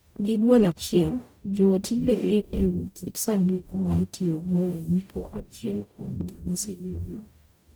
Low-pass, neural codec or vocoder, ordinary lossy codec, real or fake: none; codec, 44.1 kHz, 0.9 kbps, DAC; none; fake